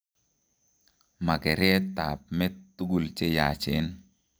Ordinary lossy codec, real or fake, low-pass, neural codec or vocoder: none; real; none; none